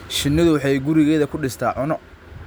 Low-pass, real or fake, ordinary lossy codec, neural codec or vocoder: none; real; none; none